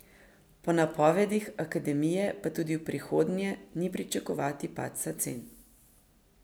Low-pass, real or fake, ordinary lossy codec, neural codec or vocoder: none; real; none; none